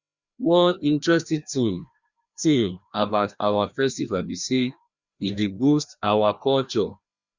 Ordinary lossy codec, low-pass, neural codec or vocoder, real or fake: Opus, 64 kbps; 7.2 kHz; codec, 16 kHz, 1 kbps, FreqCodec, larger model; fake